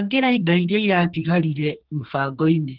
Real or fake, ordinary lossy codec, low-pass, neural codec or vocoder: fake; Opus, 16 kbps; 5.4 kHz; codec, 32 kHz, 1.9 kbps, SNAC